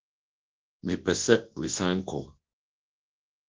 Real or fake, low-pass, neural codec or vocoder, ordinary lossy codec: fake; 7.2 kHz; codec, 24 kHz, 0.9 kbps, WavTokenizer, large speech release; Opus, 16 kbps